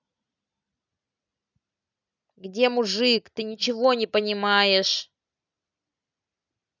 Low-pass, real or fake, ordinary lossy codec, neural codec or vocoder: 7.2 kHz; real; none; none